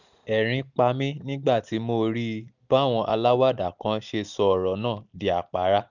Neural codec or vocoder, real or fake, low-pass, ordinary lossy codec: none; real; 7.2 kHz; none